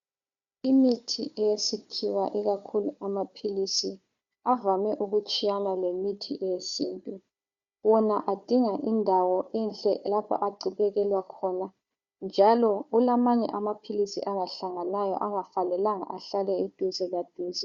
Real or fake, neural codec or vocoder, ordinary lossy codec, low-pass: fake; codec, 16 kHz, 4 kbps, FunCodec, trained on Chinese and English, 50 frames a second; Opus, 64 kbps; 7.2 kHz